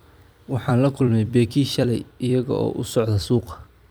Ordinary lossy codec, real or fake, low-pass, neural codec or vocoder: none; fake; none; vocoder, 44.1 kHz, 128 mel bands every 256 samples, BigVGAN v2